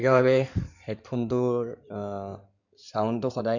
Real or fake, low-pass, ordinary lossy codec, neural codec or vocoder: fake; 7.2 kHz; none; codec, 16 kHz in and 24 kHz out, 2.2 kbps, FireRedTTS-2 codec